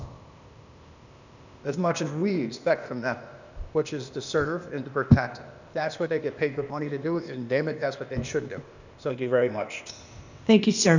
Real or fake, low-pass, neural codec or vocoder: fake; 7.2 kHz; codec, 16 kHz, 0.8 kbps, ZipCodec